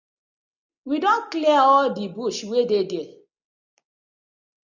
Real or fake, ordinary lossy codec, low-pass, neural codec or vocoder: real; MP3, 64 kbps; 7.2 kHz; none